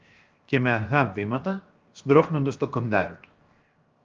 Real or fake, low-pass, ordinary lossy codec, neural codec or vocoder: fake; 7.2 kHz; Opus, 24 kbps; codec, 16 kHz, 0.7 kbps, FocalCodec